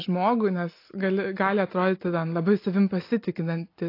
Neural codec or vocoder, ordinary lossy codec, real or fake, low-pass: none; AAC, 32 kbps; real; 5.4 kHz